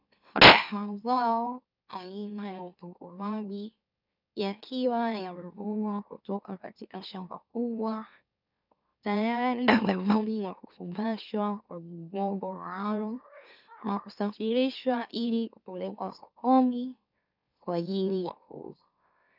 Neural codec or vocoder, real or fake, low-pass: autoencoder, 44.1 kHz, a latent of 192 numbers a frame, MeloTTS; fake; 5.4 kHz